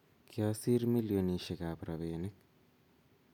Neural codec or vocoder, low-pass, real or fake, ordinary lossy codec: none; 19.8 kHz; real; none